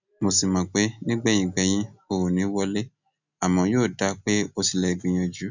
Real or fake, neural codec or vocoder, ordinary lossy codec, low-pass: real; none; none; 7.2 kHz